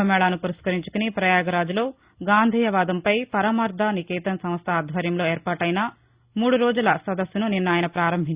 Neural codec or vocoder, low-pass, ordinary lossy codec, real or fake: none; 3.6 kHz; Opus, 64 kbps; real